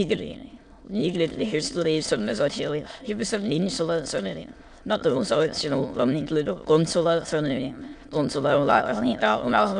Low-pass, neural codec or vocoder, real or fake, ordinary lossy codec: 9.9 kHz; autoencoder, 22.05 kHz, a latent of 192 numbers a frame, VITS, trained on many speakers; fake; AAC, 64 kbps